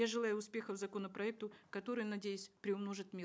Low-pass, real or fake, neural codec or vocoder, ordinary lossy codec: none; real; none; none